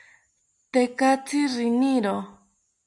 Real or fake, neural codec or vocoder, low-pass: real; none; 10.8 kHz